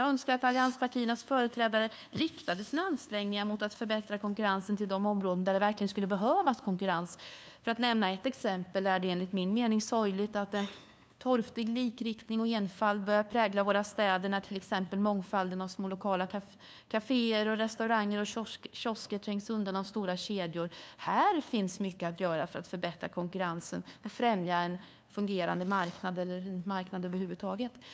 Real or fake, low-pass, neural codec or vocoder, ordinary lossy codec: fake; none; codec, 16 kHz, 2 kbps, FunCodec, trained on LibriTTS, 25 frames a second; none